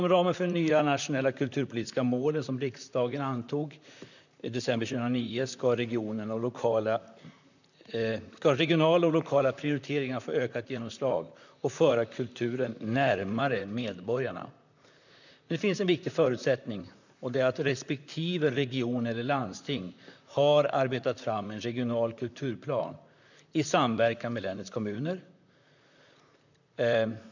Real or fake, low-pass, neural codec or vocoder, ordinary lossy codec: fake; 7.2 kHz; vocoder, 44.1 kHz, 128 mel bands, Pupu-Vocoder; none